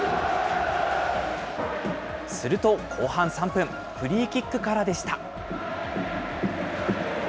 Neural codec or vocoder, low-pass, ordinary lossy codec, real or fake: none; none; none; real